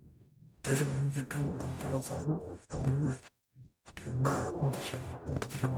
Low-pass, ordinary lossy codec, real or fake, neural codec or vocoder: none; none; fake; codec, 44.1 kHz, 0.9 kbps, DAC